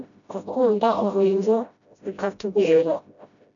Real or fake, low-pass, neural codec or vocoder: fake; 7.2 kHz; codec, 16 kHz, 0.5 kbps, FreqCodec, smaller model